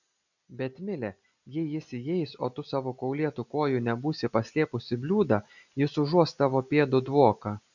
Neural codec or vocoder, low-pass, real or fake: none; 7.2 kHz; real